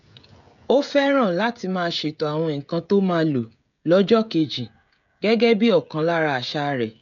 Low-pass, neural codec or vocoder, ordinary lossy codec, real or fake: 7.2 kHz; codec, 16 kHz, 16 kbps, FreqCodec, smaller model; none; fake